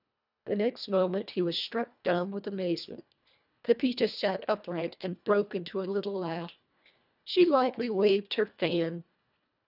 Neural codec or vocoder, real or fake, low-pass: codec, 24 kHz, 1.5 kbps, HILCodec; fake; 5.4 kHz